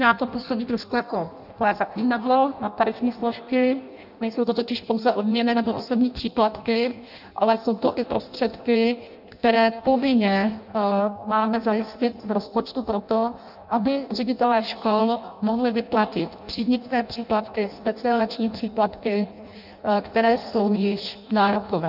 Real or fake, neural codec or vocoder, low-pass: fake; codec, 16 kHz in and 24 kHz out, 0.6 kbps, FireRedTTS-2 codec; 5.4 kHz